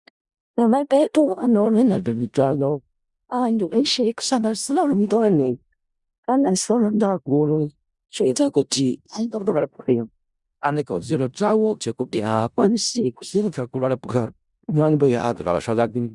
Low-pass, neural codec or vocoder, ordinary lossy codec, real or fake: 10.8 kHz; codec, 16 kHz in and 24 kHz out, 0.4 kbps, LongCat-Audio-Codec, four codebook decoder; Opus, 64 kbps; fake